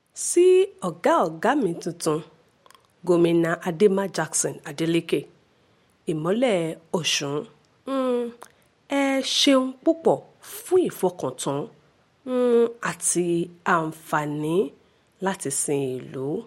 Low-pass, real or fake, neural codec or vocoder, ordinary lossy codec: 19.8 kHz; real; none; MP3, 64 kbps